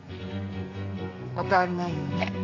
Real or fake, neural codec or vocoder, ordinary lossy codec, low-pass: fake; codec, 32 kHz, 1.9 kbps, SNAC; none; 7.2 kHz